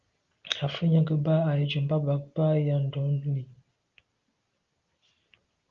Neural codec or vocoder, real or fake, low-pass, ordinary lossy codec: none; real; 7.2 kHz; Opus, 32 kbps